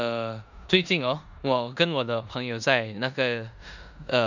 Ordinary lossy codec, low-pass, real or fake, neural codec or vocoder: none; 7.2 kHz; fake; codec, 16 kHz in and 24 kHz out, 0.9 kbps, LongCat-Audio-Codec, four codebook decoder